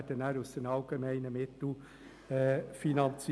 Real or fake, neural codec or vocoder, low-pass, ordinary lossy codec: real; none; none; none